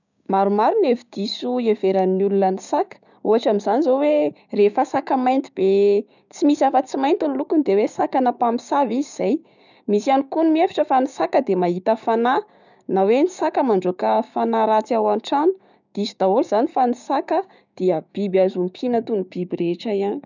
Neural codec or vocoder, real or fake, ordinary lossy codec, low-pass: codec, 16 kHz, 6 kbps, DAC; fake; none; 7.2 kHz